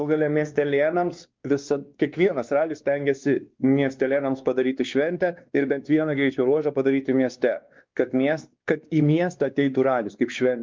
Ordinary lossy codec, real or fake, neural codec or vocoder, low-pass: Opus, 24 kbps; fake; codec, 16 kHz, 2 kbps, X-Codec, WavLM features, trained on Multilingual LibriSpeech; 7.2 kHz